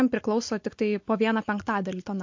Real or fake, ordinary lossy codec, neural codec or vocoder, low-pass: real; MP3, 48 kbps; none; 7.2 kHz